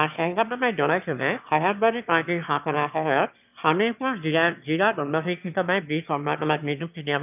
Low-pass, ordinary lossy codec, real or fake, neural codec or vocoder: 3.6 kHz; none; fake; autoencoder, 22.05 kHz, a latent of 192 numbers a frame, VITS, trained on one speaker